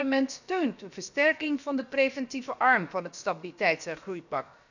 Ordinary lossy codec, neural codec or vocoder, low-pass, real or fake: none; codec, 16 kHz, about 1 kbps, DyCAST, with the encoder's durations; 7.2 kHz; fake